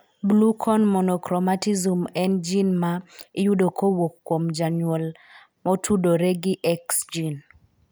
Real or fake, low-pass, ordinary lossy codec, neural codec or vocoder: real; none; none; none